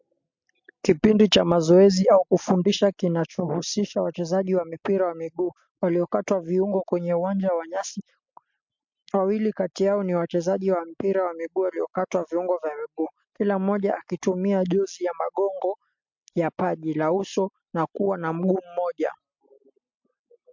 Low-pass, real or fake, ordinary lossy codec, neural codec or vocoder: 7.2 kHz; real; MP3, 48 kbps; none